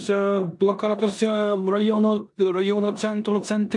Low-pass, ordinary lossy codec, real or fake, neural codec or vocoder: 10.8 kHz; AAC, 64 kbps; fake; codec, 16 kHz in and 24 kHz out, 0.9 kbps, LongCat-Audio-Codec, four codebook decoder